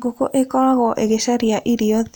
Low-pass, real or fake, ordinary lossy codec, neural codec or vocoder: none; real; none; none